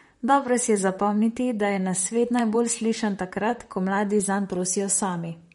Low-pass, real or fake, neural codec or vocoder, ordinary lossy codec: 19.8 kHz; fake; vocoder, 44.1 kHz, 128 mel bands, Pupu-Vocoder; MP3, 48 kbps